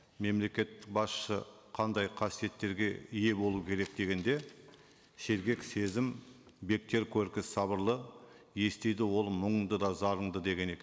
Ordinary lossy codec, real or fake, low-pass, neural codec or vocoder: none; real; none; none